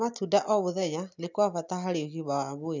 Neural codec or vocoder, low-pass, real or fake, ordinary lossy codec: none; 7.2 kHz; real; none